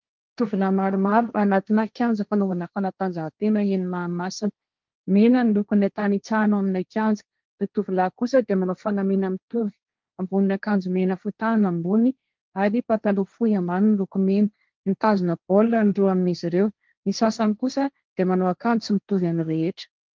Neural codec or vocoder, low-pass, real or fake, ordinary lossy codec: codec, 16 kHz, 1.1 kbps, Voila-Tokenizer; 7.2 kHz; fake; Opus, 24 kbps